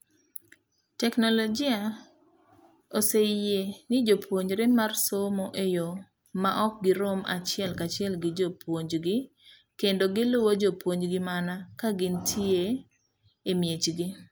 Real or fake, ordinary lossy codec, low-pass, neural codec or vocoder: real; none; none; none